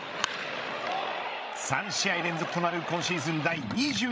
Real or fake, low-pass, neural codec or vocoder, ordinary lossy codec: fake; none; codec, 16 kHz, 16 kbps, FreqCodec, larger model; none